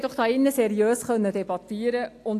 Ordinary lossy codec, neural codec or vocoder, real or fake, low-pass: AAC, 64 kbps; none; real; 14.4 kHz